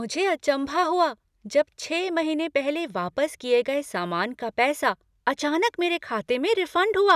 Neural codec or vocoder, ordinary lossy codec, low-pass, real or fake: none; none; 14.4 kHz; real